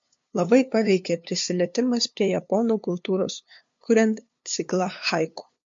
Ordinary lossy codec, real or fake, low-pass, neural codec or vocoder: MP3, 48 kbps; fake; 7.2 kHz; codec, 16 kHz, 2 kbps, FunCodec, trained on LibriTTS, 25 frames a second